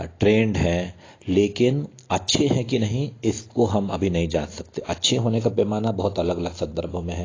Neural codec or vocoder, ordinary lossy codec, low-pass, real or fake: none; AAC, 32 kbps; 7.2 kHz; real